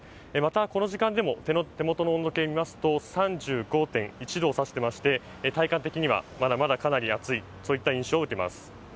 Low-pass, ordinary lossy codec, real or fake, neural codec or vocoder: none; none; real; none